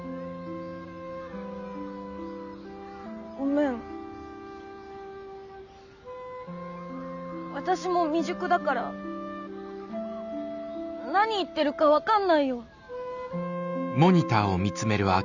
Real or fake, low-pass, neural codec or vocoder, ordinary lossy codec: real; 7.2 kHz; none; none